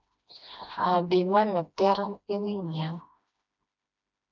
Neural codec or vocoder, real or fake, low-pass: codec, 16 kHz, 1 kbps, FreqCodec, smaller model; fake; 7.2 kHz